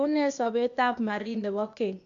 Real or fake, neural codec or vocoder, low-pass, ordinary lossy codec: fake; codec, 16 kHz, 0.8 kbps, ZipCodec; 7.2 kHz; none